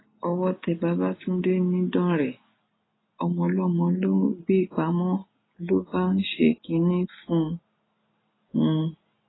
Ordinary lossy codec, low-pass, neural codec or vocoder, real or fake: AAC, 16 kbps; 7.2 kHz; none; real